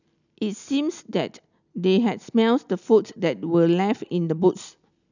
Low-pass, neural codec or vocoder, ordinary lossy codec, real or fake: 7.2 kHz; none; none; real